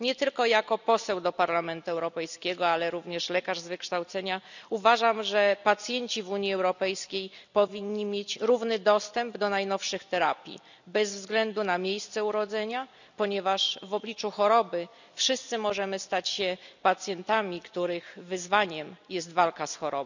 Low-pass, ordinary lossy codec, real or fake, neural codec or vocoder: 7.2 kHz; none; real; none